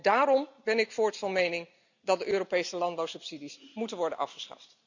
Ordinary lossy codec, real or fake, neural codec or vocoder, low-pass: none; real; none; 7.2 kHz